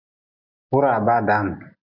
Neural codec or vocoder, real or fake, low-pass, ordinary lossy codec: none; real; 5.4 kHz; Opus, 64 kbps